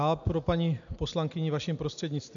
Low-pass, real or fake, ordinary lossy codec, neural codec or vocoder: 7.2 kHz; real; AAC, 64 kbps; none